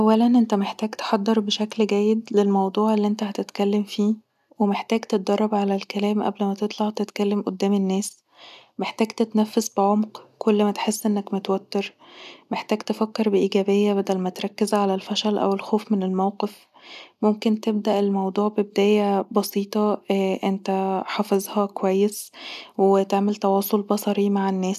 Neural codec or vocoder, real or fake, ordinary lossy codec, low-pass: none; real; none; 14.4 kHz